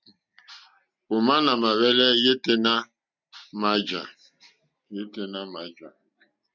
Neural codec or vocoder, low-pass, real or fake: none; 7.2 kHz; real